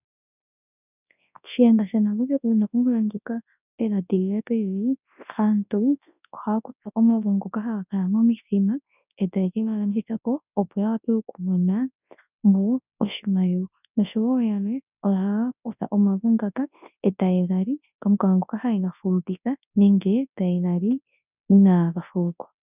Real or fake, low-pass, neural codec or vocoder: fake; 3.6 kHz; codec, 24 kHz, 0.9 kbps, WavTokenizer, large speech release